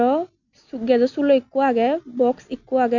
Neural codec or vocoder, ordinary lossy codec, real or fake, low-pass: none; none; real; 7.2 kHz